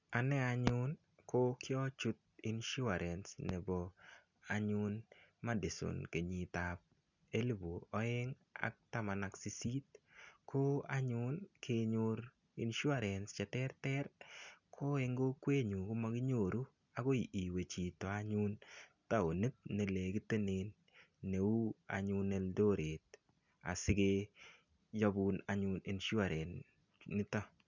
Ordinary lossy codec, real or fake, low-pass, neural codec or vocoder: none; real; 7.2 kHz; none